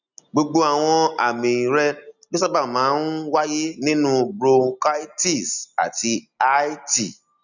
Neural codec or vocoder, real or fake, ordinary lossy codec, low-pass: none; real; none; 7.2 kHz